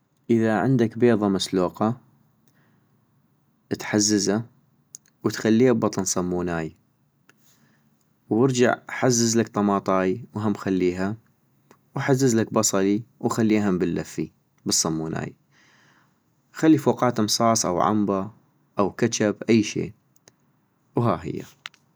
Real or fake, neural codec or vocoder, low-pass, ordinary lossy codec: real; none; none; none